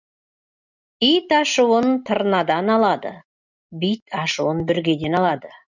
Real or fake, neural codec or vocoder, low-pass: real; none; 7.2 kHz